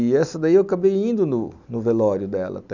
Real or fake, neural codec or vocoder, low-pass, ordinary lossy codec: real; none; 7.2 kHz; none